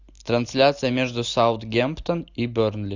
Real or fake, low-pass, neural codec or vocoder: real; 7.2 kHz; none